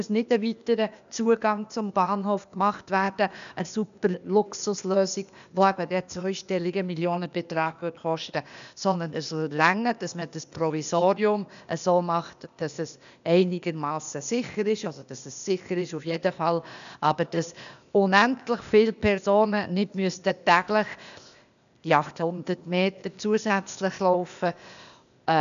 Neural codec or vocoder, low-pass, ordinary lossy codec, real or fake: codec, 16 kHz, 0.8 kbps, ZipCodec; 7.2 kHz; none; fake